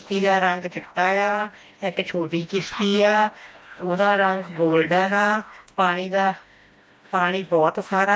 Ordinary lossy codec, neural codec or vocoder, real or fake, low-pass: none; codec, 16 kHz, 1 kbps, FreqCodec, smaller model; fake; none